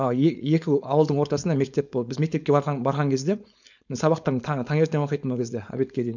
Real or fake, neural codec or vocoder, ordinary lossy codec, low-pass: fake; codec, 16 kHz, 4.8 kbps, FACodec; none; 7.2 kHz